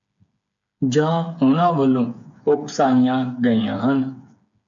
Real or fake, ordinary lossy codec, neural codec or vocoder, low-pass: fake; MP3, 64 kbps; codec, 16 kHz, 8 kbps, FreqCodec, smaller model; 7.2 kHz